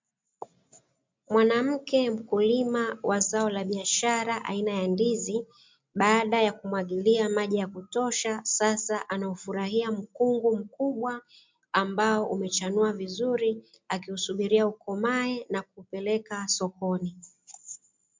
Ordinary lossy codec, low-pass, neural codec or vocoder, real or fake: MP3, 64 kbps; 7.2 kHz; none; real